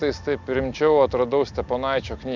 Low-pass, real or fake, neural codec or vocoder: 7.2 kHz; real; none